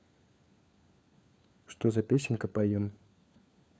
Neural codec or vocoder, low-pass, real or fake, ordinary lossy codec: codec, 16 kHz, 4 kbps, FunCodec, trained on LibriTTS, 50 frames a second; none; fake; none